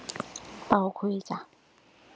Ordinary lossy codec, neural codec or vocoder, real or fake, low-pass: none; none; real; none